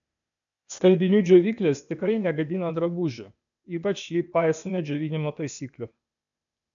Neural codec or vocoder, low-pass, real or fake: codec, 16 kHz, 0.8 kbps, ZipCodec; 7.2 kHz; fake